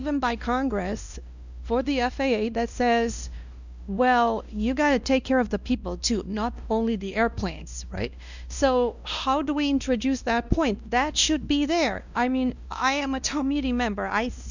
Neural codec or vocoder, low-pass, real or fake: codec, 16 kHz, 1 kbps, X-Codec, WavLM features, trained on Multilingual LibriSpeech; 7.2 kHz; fake